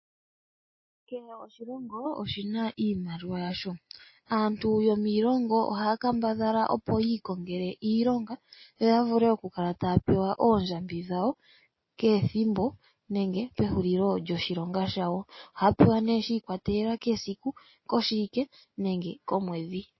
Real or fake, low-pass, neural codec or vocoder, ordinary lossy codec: real; 7.2 kHz; none; MP3, 24 kbps